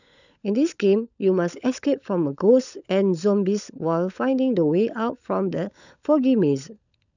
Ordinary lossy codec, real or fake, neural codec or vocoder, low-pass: none; fake; codec, 16 kHz, 16 kbps, FunCodec, trained on LibriTTS, 50 frames a second; 7.2 kHz